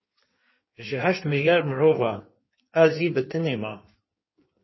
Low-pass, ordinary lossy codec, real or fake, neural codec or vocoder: 7.2 kHz; MP3, 24 kbps; fake; codec, 16 kHz in and 24 kHz out, 1.1 kbps, FireRedTTS-2 codec